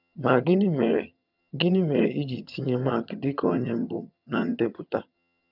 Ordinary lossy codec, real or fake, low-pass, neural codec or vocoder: none; fake; 5.4 kHz; vocoder, 22.05 kHz, 80 mel bands, HiFi-GAN